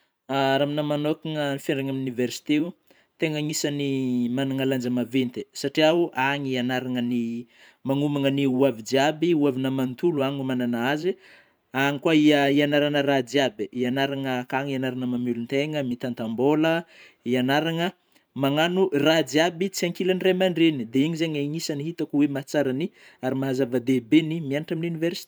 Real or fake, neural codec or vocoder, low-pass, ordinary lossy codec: fake; vocoder, 44.1 kHz, 128 mel bands every 512 samples, BigVGAN v2; none; none